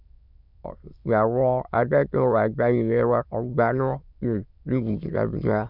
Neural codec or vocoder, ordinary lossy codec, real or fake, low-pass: autoencoder, 22.05 kHz, a latent of 192 numbers a frame, VITS, trained on many speakers; none; fake; 5.4 kHz